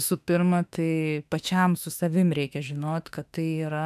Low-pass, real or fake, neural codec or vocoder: 14.4 kHz; fake; autoencoder, 48 kHz, 32 numbers a frame, DAC-VAE, trained on Japanese speech